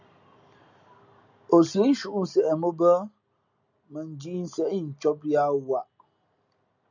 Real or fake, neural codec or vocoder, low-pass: real; none; 7.2 kHz